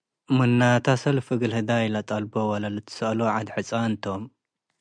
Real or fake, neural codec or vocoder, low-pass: real; none; 9.9 kHz